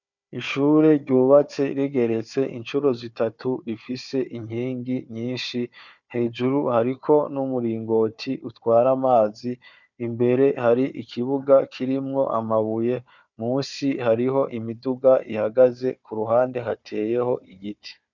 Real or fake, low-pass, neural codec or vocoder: fake; 7.2 kHz; codec, 16 kHz, 4 kbps, FunCodec, trained on Chinese and English, 50 frames a second